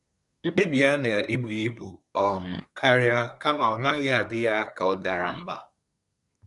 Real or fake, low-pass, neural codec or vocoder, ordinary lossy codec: fake; 10.8 kHz; codec, 24 kHz, 1 kbps, SNAC; none